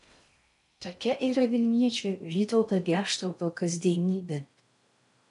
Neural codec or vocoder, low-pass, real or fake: codec, 16 kHz in and 24 kHz out, 0.6 kbps, FocalCodec, streaming, 4096 codes; 10.8 kHz; fake